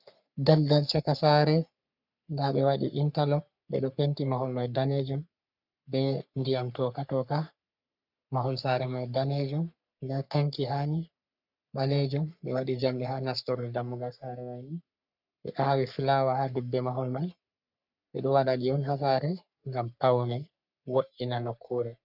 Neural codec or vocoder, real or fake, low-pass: codec, 44.1 kHz, 3.4 kbps, Pupu-Codec; fake; 5.4 kHz